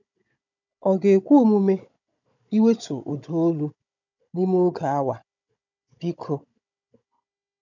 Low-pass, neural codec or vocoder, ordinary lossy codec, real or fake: 7.2 kHz; codec, 16 kHz, 16 kbps, FunCodec, trained on Chinese and English, 50 frames a second; none; fake